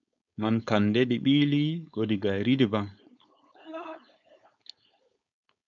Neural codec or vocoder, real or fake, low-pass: codec, 16 kHz, 4.8 kbps, FACodec; fake; 7.2 kHz